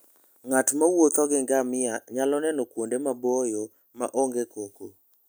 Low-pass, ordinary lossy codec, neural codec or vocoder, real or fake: none; none; none; real